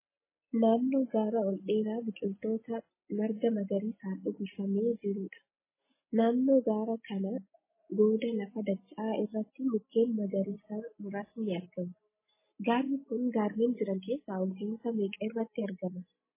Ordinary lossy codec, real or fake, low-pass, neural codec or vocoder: MP3, 16 kbps; real; 3.6 kHz; none